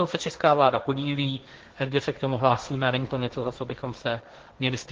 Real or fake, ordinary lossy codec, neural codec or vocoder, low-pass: fake; Opus, 16 kbps; codec, 16 kHz, 1.1 kbps, Voila-Tokenizer; 7.2 kHz